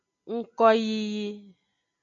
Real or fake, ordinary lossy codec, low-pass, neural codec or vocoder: real; MP3, 64 kbps; 7.2 kHz; none